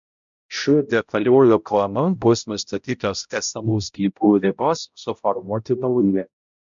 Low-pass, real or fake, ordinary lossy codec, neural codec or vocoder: 7.2 kHz; fake; MP3, 64 kbps; codec, 16 kHz, 0.5 kbps, X-Codec, HuBERT features, trained on balanced general audio